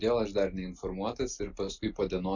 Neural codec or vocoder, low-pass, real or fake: none; 7.2 kHz; real